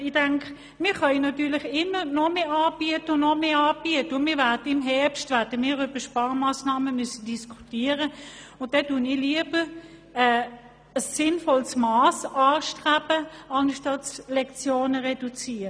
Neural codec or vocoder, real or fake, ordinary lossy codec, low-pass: none; real; none; none